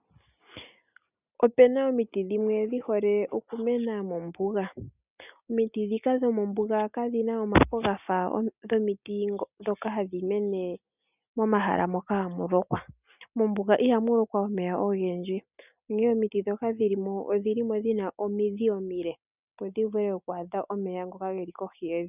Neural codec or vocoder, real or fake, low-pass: none; real; 3.6 kHz